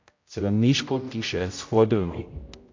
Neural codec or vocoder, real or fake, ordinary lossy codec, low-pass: codec, 16 kHz, 0.5 kbps, X-Codec, HuBERT features, trained on general audio; fake; MP3, 48 kbps; 7.2 kHz